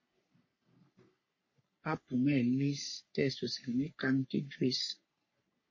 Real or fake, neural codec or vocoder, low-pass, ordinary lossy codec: fake; codec, 44.1 kHz, 3.4 kbps, Pupu-Codec; 7.2 kHz; MP3, 32 kbps